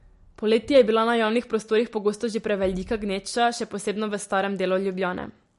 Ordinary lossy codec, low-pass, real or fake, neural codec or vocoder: MP3, 48 kbps; 14.4 kHz; real; none